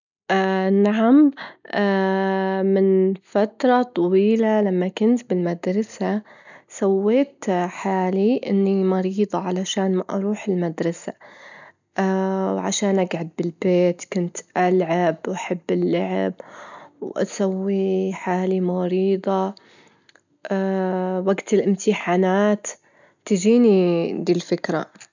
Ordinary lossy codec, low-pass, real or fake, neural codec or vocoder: none; 7.2 kHz; real; none